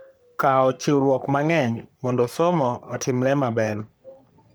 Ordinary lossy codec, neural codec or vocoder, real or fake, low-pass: none; codec, 44.1 kHz, 3.4 kbps, Pupu-Codec; fake; none